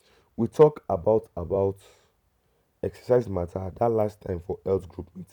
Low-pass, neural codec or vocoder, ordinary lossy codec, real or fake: 19.8 kHz; vocoder, 44.1 kHz, 128 mel bands every 256 samples, BigVGAN v2; none; fake